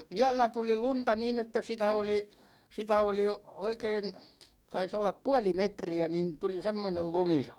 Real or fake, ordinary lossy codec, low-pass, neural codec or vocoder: fake; none; 19.8 kHz; codec, 44.1 kHz, 2.6 kbps, DAC